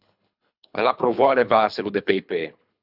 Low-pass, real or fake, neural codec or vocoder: 5.4 kHz; fake; codec, 24 kHz, 3 kbps, HILCodec